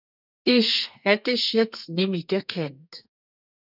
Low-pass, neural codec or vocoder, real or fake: 5.4 kHz; codec, 32 kHz, 1.9 kbps, SNAC; fake